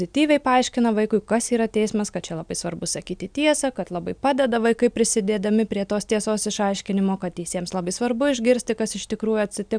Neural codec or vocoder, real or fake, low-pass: none; real; 9.9 kHz